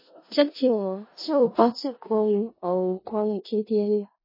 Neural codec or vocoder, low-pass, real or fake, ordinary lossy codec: codec, 16 kHz in and 24 kHz out, 0.4 kbps, LongCat-Audio-Codec, four codebook decoder; 5.4 kHz; fake; MP3, 24 kbps